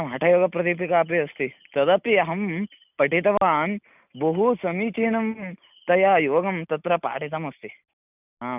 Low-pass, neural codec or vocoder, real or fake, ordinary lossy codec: 3.6 kHz; none; real; none